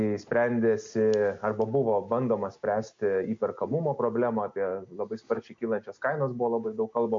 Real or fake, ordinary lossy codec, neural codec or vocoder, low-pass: real; MP3, 48 kbps; none; 7.2 kHz